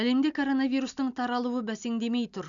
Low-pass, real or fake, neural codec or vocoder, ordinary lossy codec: 7.2 kHz; real; none; none